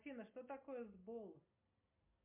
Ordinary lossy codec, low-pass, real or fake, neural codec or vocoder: MP3, 32 kbps; 3.6 kHz; real; none